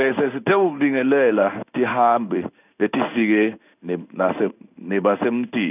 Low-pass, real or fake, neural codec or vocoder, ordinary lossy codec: 3.6 kHz; fake; codec, 16 kHz in and 24 kHz out, 1 kbps, XY-Tokenizer; none